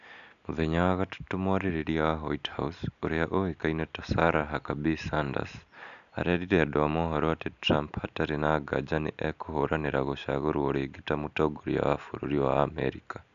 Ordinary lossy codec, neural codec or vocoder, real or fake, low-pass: none; none; real; 7.2 kHz